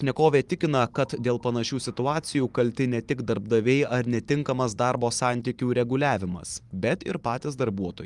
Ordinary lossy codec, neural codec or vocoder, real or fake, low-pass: Opus, 32 kbps; vocoder, 44.1 kHz, 128 mel bands every 512 samples, BigVGAN v2; fake; 10.8 kHz